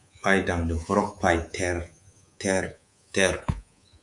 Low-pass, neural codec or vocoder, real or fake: 10.8 kHz; codec, 24 kHz, 3.1 kbps, DualCodec; fake